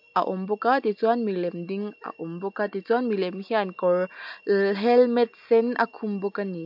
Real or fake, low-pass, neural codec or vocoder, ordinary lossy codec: real; 5.4 kHz; none; MP3, 48 kbps